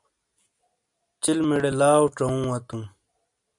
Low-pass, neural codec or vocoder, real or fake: 10.8 kHz; none; real